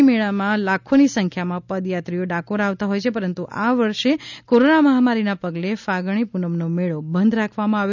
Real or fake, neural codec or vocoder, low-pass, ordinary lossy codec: real; none; 7.2 kHz; none